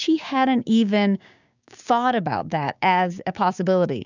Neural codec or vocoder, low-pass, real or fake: codec, 16 kHz, 6 kbps, DAC; 7.2 kHz; fake